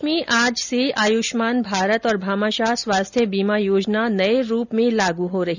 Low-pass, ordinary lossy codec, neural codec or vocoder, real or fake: 7.2 kHz; none; none; real